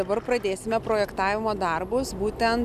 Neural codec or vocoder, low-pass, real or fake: none; 14.4 kHz; real